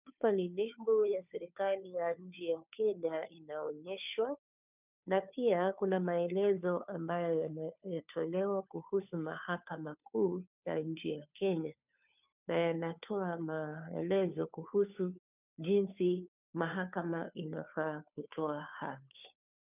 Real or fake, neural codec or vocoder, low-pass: fake; codec, 16 kHz, 2 kbps, FunCodec, trained on Chinese and English, 25 frames a second; 3.6 kHz